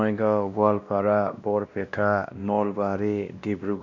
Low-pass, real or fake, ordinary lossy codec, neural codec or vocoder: 7.2 kHz; fake; none; codec, 16 kHz, 1 kbps, X-Codec, WavLM features, trained on Multilingual LibriSpeech